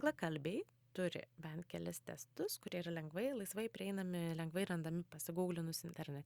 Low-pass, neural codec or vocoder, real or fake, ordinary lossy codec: 19.8 kHz; none; real; Opus, 64 kbps